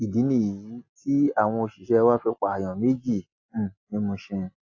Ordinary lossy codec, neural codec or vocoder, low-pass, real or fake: AAC, 48 kbps; none; 7.2 kHz; real